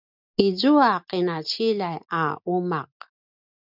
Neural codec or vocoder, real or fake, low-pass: none; real; 5.4 kHz